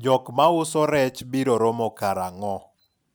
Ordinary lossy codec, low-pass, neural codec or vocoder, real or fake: none; none; none; real